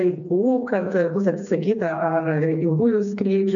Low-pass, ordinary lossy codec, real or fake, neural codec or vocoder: 7.2 kHz; MP3, 64 kbps; fake; codec, 16 kHz, 2 kbps, FreqCodec, smaller model